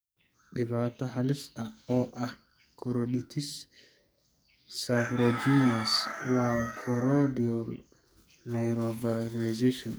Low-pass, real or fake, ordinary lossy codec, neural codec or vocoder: none; fake; none; codec, 44.1 kHz, 2.6 kbps, SNAC